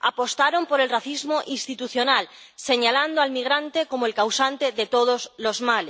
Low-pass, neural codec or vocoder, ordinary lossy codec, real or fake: none; none; none; real